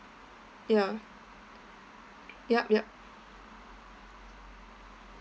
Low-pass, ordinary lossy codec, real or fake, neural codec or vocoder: none; none; real; none